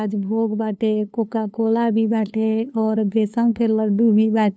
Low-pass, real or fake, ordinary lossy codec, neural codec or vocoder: none; fake; none; codec, 16 kHz, 2 kbps, FunCodec, trained on LibriTTS, 25 frames a second